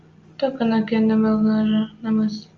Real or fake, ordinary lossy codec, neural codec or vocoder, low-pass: real; Opus, 32 kbps; none; 7.2 kHz